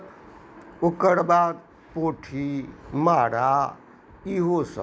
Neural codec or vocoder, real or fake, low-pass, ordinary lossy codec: none; real; none; none